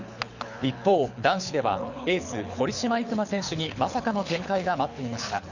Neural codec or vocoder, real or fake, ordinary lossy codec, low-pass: codec, 24 kHz, 6 kbps, HILCodec; fake; none; 7.2 kHz